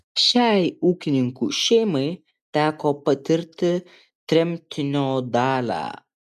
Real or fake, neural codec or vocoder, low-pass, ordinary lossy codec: real; none; 14.4 kHz; MP3, 96 kbps